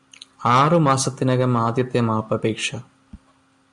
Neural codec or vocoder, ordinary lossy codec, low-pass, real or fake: none; MP3, 96 kbps; 10.8 kHz; real